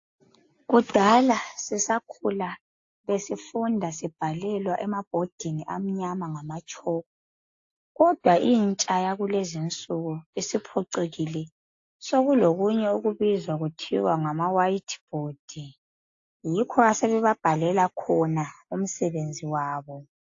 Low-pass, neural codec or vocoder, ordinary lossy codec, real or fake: 7.2 kHz; none; AAC, 48 kbps; real